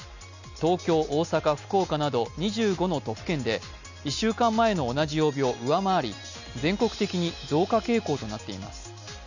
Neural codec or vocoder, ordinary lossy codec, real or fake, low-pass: none; none; real; 7.2 kHz